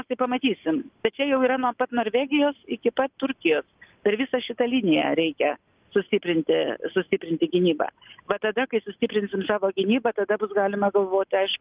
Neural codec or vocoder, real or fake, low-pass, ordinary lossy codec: none; real; 3.6 kHz; Opus, 24 kbps